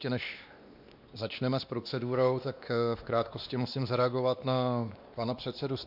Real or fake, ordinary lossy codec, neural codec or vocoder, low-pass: fake; MP3, 48 kbps; codec, 16 kHz, 2 kbps, X-Codec, WavLM features, trained on Multilingual LibriSpeech; 5.4 kHz